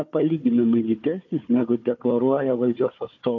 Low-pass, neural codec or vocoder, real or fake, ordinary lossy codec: 7.2 kHz; codec, 16 kHz, 4 kbps, FunCodec, trained on Chinese and English, 50 frames a second; fake; MP3, 48 kbps